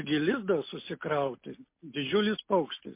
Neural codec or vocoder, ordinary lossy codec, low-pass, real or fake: none; MP3, 24 kbps; 3.6 kHz; real